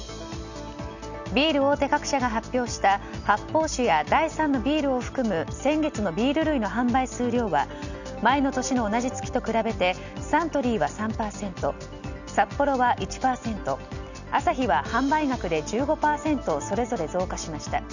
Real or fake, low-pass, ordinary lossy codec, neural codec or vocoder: real; 7.2 kHz; none; none